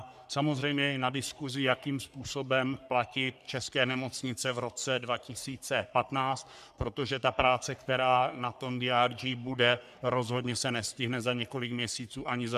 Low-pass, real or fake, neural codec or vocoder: 14.4 kHz; fake; codec, 44.1 kHz, 3.4 kbps, Pupu-Codec